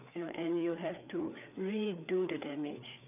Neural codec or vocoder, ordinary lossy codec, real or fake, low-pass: codec, 16 kHz, 4 kbps, FreqCodec, larger model; none; fake; 3.6 kHz